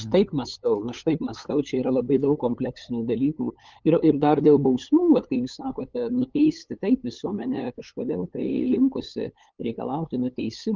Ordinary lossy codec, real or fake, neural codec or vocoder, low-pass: Opus, 24 kbps; fake; codec, 16 kHz, 8 kbps, FunCodec, trained on LibriTTS, 25 frames a second; 7.2 kHz